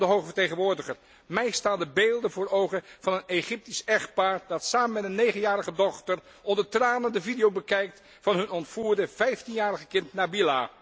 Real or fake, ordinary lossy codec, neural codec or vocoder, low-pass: real; none; none; none